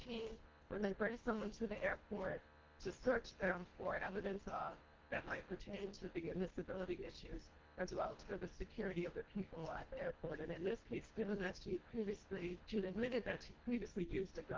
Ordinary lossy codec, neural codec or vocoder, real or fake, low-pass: Opus, 16 kbps; codec, 24 kHz, 1.5 kbps, HILCodec; fake; 7.2 kHz